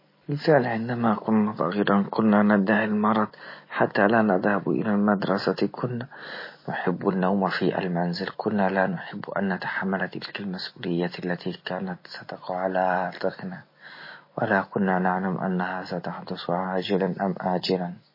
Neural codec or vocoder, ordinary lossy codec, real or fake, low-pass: none; MP3, 24 kbps; real; 5.4 kHz